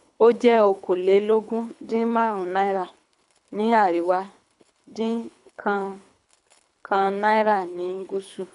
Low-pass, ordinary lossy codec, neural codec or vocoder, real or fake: 10.8 kHz; none; codec, 24 kHz, 3 kbps, HILCodec; fake